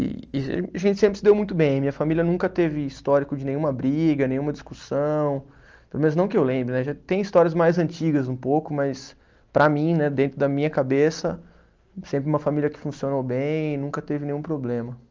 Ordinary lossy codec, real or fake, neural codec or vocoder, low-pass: Opus, 24 kbps; real; none; 7.2 kHz